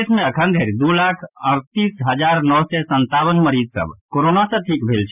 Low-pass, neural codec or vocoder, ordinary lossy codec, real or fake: 3.6 kHz; none; none; real